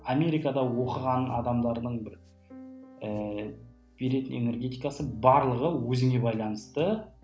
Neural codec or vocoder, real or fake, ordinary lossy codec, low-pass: none; real; none; none